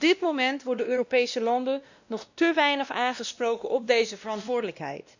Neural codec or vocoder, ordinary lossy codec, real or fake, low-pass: codec, 16 kHz, 1 kbps, X-Codec, WavLM features, trained on Multilingual LibriSpeech; none; fake; 7.2 kHz